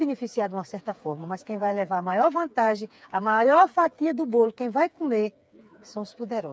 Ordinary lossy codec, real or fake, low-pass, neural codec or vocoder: none; fake; none; codec, 16 kHz, 4 kbps, FreqCodec, smaller model